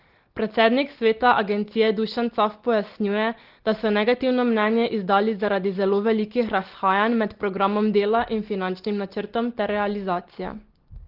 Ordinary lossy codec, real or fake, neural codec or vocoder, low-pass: Opus, 16 kbps; real; none; 5.4 kHz